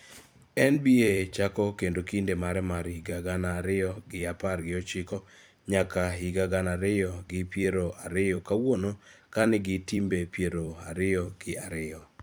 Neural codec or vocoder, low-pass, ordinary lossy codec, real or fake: vocoder, 44.1 kHz, 128 mel bands every 256 samples, BigVGAN v2; none; none; fake